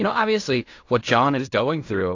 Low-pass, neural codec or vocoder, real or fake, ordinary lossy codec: 7.2 kHz; codec, 16 kHz in and 24 kHz out, 0.4 kbps, LongCat-Audio-Codec, fine tuned four codebook decoder; fake; AAC, 48 kbps